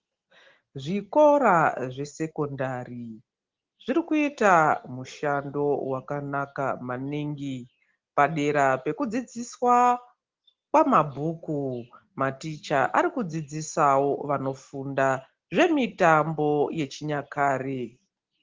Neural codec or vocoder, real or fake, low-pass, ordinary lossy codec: none; real; 7.2 kHz; Opus, 16 kbps